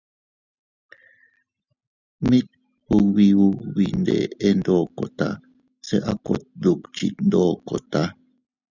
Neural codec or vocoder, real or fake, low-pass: none; real; 7.2 kHz